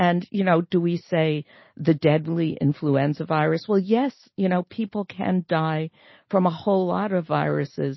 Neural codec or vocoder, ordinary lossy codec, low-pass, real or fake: none; MP3, 24 kbps; 7.2 kHz; real